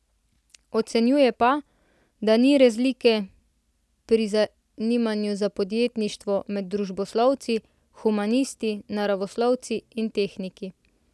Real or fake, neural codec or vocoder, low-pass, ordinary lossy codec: real; none; none; none